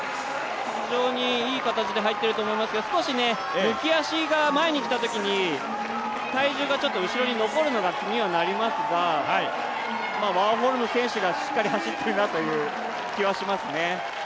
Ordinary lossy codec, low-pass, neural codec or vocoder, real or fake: none; none; none; real